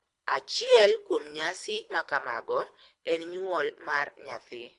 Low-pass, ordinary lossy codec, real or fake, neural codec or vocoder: 10.8 kHz; AAC, 64 kbps; fake; codec, 24 kHz, 3 kbps, HILCodec